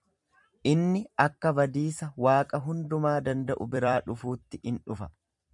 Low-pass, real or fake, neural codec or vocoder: 10.8 kHz; fake; vocoder, 44.1 kHz, 128 mel bands every 256 samples, BigVGAN v2